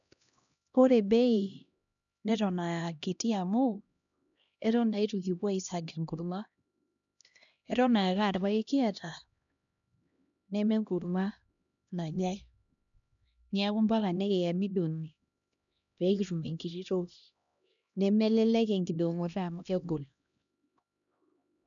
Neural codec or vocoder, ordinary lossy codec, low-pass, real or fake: codec, 16 kHz, 1 kbps, X-Codec, HuBERT features, trained on LibriSpeech; none; 7.2 kHz; fake